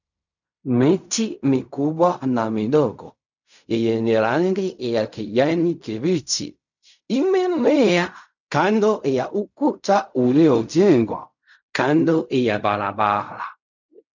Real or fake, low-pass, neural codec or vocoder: fake; 7.2 kHz; codec, 16 kHz in and 24 kHz out, 0.4 kbps, LongCat-Audio-Codec, fine tuned four codebook decoder